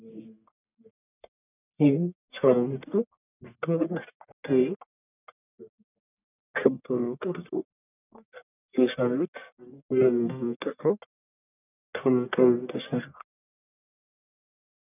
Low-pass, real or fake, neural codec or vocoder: 3.6 kHz; fake; codec, 44.1 kHz, 1.7 kbps, Pupu-Codec